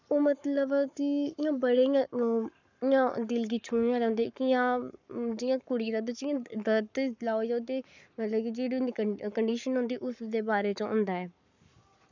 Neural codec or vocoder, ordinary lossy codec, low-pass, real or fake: codec, 44.1 kHz, 7.8 kbps, Pupu-Codec; none; 7.2 kHz; fake